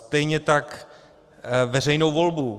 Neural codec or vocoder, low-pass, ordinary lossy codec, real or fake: autoencoder, 48 kHz, 128 numbers a frame, DAC-VAE, trained on Japanese speech; 14.4 kHz; Opus, 24 kbps; fake